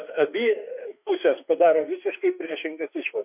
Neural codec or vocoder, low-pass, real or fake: autoencoder, 48 kHz, 32 numbers a frame, DAC-VAE, trained on Japanese speech; 3.6 kHz; fake